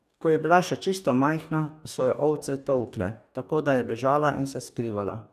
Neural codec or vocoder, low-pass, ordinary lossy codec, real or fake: codec, 44.1 kHz, 2.6 kbps, DAC; 14.4 kHz; none; fake